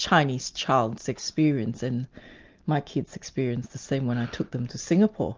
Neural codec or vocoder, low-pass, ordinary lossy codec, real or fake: none; 7.2 kHz; Opus, 32 kbps; real